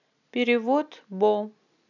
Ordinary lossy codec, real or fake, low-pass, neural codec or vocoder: none; real; 7.2 kHz; none